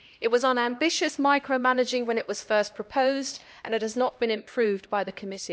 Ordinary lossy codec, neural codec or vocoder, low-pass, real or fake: none; codec, 16 kHz, 1 kbps, X-Codec, HuBERT features, trained on LibriSpeech; none; fake